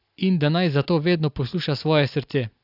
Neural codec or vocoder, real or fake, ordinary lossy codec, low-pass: none; real; AAC, 48 kbps; 5.4 kHz